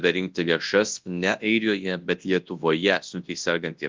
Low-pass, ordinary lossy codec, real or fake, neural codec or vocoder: 7.2 kHz; Opus, 24 kbps; fake; codec, 24 kHz, 0.9 kbps, WavTokenizer, large speech release